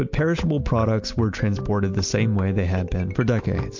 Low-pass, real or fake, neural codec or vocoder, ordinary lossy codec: 7.2 kHz; real; none; MP3, 48 kbps